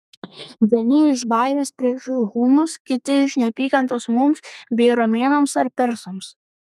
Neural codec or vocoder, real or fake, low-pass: codec, 32 kHz, 1.9 kbps, SNAC; fake; 14.4 kHz